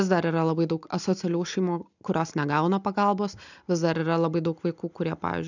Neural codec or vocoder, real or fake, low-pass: none; real; 7.2 kHz